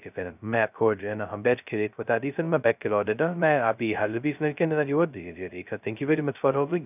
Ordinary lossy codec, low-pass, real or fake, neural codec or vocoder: none; 3.6 kHz; fake; codec, 16 kHz, 0.2 kbps, FocalCodec